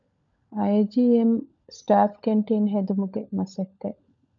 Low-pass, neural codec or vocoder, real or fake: 7.2 kHz; codec, 16 kHz, 16 kbps, FunCodec, trained on LibriTTS, 50 frames a second; fake